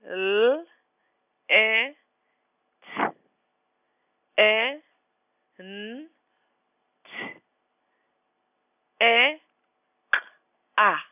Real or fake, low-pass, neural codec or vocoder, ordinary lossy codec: real; 3.6 kHz; none; none